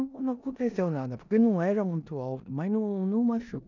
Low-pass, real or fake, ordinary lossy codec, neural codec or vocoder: 7.2 kHz; fake; none; codec, 16 kHz in and 24 kHz out, 0.9 kbps, LongCat-Audio-Codec, four codebook decoder